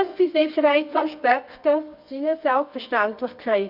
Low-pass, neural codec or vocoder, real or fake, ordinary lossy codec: 5.4 kHz; codec, 24 kHz, 0.9 kbps, WavTokenizer, medium music audio release; fake; none